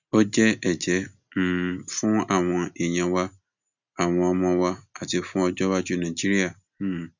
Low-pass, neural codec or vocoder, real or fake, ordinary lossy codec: 7.2 kHz; none; real; none